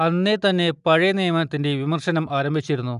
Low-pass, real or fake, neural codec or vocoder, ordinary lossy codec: 10.8 kHz; real; none; none